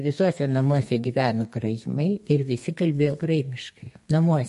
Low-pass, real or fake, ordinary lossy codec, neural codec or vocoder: 14.4 kHz; fake; MP3, 48 kbps; codec, 32 kHz, 1.9 kbps, SNAC